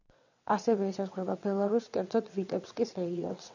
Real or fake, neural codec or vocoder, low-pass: fake; vocoder, 22.05 kHz, 80 mel bands, WaveNeXt; 7.2 kHz